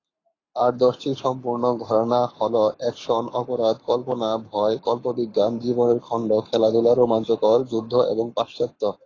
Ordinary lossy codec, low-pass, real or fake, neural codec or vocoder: AAC, 32 kbps; 7.2 kHz; fake; codec, 44.1 kHz, 7.8 kbps, Pupu-Codec